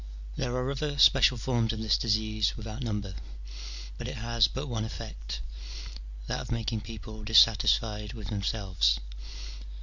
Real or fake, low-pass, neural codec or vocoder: real; 7.2 kHz; none